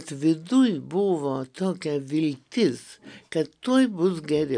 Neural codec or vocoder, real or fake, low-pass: none; real; 9.9 kHz